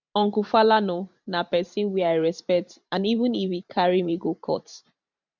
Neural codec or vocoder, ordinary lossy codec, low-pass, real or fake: vocoder, 44.1 kHz, 128 mel bands every 512 samples, BigVGAN v2; none; 7.2 kHz; fake